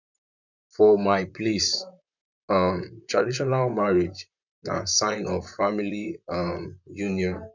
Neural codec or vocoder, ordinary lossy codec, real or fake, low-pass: vocoder, 44.1 kHz, 128 mel bands, Pupu-Vocoder; none; fake; 7.2 kHz